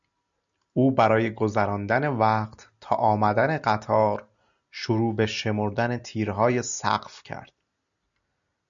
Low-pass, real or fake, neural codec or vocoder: 7.2 kHz; real; none